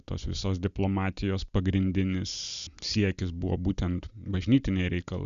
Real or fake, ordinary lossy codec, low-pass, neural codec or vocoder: real; Opus, 64 kbps; 7.2 kHz; none